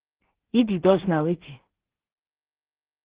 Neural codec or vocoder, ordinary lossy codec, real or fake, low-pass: codec, 16 kHz in and 24 kHz out, 0.4 kbps, LongCat-Audio-Codec, two codebook decoder; Opus, 16 kbps; fake; 3.6 kHz